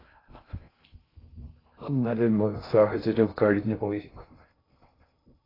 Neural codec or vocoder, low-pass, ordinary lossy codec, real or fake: codec, 16 kHz in and 24 kHz out, 0.6 kbps, FocalCodec, streaming, 2048 codes; 5.4 kHz; AAC, 24 kbps; fake